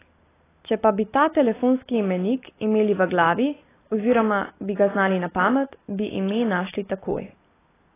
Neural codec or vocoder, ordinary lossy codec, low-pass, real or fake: none; AAC, 16 kbps; 3.6 kHz; real